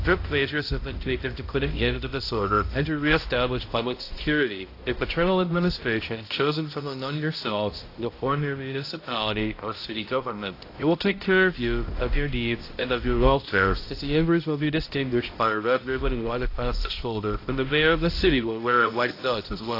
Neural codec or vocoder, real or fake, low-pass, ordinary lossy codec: codec, 16 kHz, 0.5 kbps, X-Codec, HuBERT features, trained on balanced general audio; fake; 5.4 kHz; AAC, 32 kbps